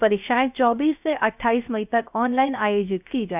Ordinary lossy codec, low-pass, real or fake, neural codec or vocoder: none; 3.6 kHz; fake; codec, 16 kHz, 0.3 kbps, FocalCodec